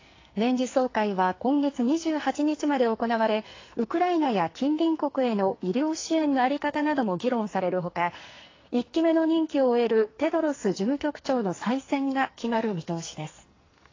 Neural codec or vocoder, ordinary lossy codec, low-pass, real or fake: codec, 44.1 kHz, 2.6 kbps, SNAC; AAC, 32 kbps; 7.2 kHz; fake